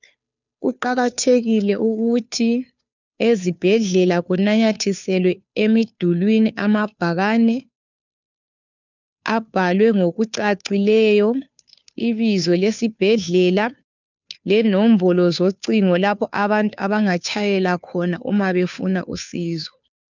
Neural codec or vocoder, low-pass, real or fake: codec, 16 kHz, 2 kbps, FunCodec, trained on Chinese and English, 25 frames a second; 7.2 kHz; fake